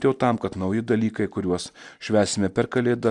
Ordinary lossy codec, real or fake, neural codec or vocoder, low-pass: Opus, 64 kbps; real; none; 10.8 kHz